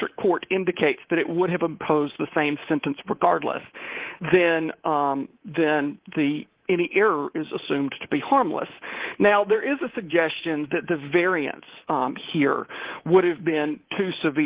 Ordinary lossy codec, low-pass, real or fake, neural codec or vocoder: Opus, 16 kbps; 3.6 kHz; fake; codec, 24 kHz, 3.1 kbps, DualCodec